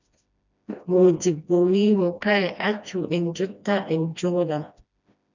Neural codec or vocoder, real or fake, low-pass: codec, 16 kHz, 1 kbps, FreqCodec, smaller model; fake; 7.2 kHz